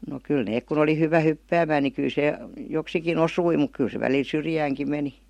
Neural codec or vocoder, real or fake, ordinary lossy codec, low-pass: none; real; MP3, 64 kbps; 19.8 kHz